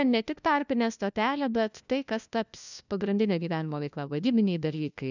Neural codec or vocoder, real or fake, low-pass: codec, 16 kHz, 1 kbps, FunCodec, trained on LibriTTS, 50 frames a second; fake; 7.2 kHz